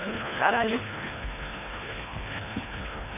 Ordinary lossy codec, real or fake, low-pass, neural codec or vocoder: none; fake; 3.6 kHz; codec, 24 kHz, 1.5 kbps, HILCodec